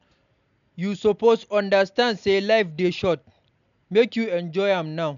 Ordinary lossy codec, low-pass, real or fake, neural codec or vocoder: none; 7.2 kHz; real; none